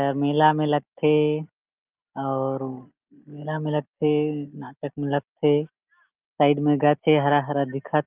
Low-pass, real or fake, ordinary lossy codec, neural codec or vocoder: 3.6 kHz; real; Opus, 24 kbps; none